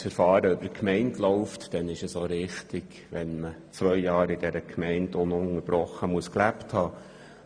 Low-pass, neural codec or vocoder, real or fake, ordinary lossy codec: 9.9 kHz; none; real; Opus, 64 kbps